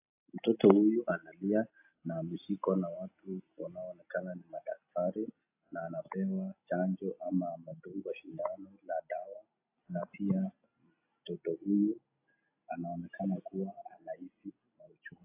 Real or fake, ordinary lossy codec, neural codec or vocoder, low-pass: real; AAC, 32 kbps; none; 3.6 kHz